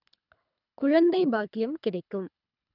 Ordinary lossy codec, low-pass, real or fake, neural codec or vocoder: none; 5.4 kHz; fake; codec, 24 kHz, 3 kbps, HILCodec